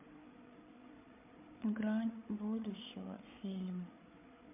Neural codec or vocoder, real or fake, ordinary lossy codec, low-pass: codec, 16 kHz, 8 kbps, FreqCodec, larger model; fake; MP3, 32 kbps; 3.6 kHz